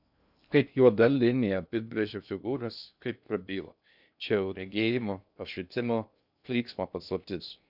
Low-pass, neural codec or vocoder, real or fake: 5.4 kHz; codec, 16 kHz in and 24 kHz out, 0.6 kbps, FocalCodec, streaming, 2048 codes; fake